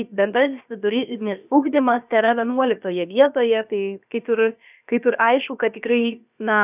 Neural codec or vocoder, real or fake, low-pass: codec, 16 kHz, about 1 kbps, DyCAST, with the encoder's durations; fake; 3.6 kHz